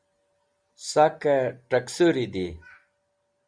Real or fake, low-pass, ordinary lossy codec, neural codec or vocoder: real; 9.9 kHz; Opus, 64 kbps; none